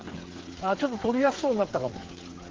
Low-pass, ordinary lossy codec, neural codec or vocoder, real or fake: 7.2 kHz; Opus, 16 kbps; codec, 16 kHz, 8 kbps, FreqCodec, smaller model; fake